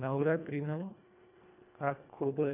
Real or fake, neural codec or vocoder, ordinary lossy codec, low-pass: fake; codec, 24 kHz, 1.5 kbps, HILCodec; none; 3.6 kHz